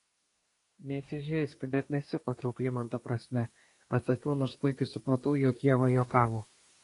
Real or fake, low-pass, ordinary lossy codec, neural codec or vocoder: fake; 10.8 kHz; AAC, 48 kbps; codec, 24 kHz, 1 kbps, SNAC